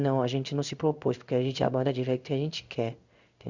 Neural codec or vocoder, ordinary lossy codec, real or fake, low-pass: codec, 16 kHz in and 24 kHz out, 1 kbps, XY-Tokenizer; Opus, 64 kbps; fake; 7.2 kHz